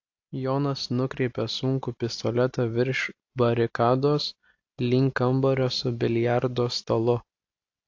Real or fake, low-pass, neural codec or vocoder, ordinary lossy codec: real; 7.2 kHz; none; AAC, 48 kbps